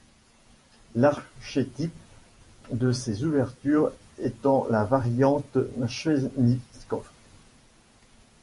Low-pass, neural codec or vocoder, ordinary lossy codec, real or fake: 14.4 kHz; vocoder, 48 kHz, 128 mel bands, Vocos; MP3, 48 kbps; fake